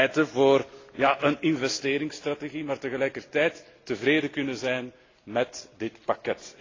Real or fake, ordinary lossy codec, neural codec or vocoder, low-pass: real; AAC, 32 kbps; none; 7.2 kHz